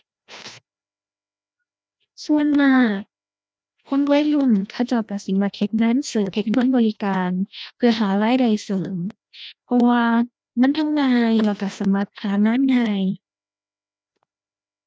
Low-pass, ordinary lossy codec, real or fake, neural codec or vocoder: none; none; fake; codec, 16 kHz, 1 kbps, FreqCodec, larger model